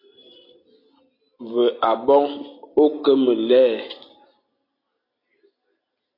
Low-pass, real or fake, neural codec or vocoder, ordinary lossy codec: 5.4 kHz; real; none; AAC, 32 kbps